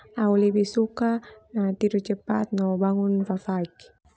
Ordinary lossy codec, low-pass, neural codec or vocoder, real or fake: none; none; none; real